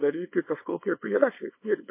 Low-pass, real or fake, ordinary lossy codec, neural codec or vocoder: 3.6 kHz; fake; MP3, 24 kbps; codec, 24 kHz, 0.9 kbps, WavTokenizer, small release